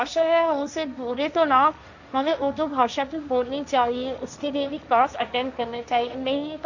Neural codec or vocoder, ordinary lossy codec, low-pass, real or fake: codec, 16 kHz, 1.1 kbps, Voila-Tokenizer; none; 7.2 kHz; fake